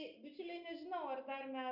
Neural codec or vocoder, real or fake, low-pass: none; real; 5.4 kHz